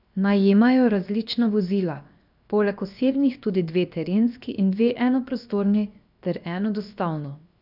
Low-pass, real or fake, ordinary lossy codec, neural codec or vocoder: 5.4 kHz; fake; none; codec, 16 kHz, 0.7 kbps, FocalCodec